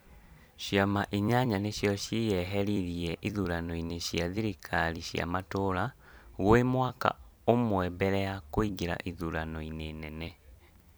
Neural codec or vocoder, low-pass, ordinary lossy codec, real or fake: vocoder, 44.1 kHz, 128 mel bands every 256 samples, BigVGAN v2; none; none; fake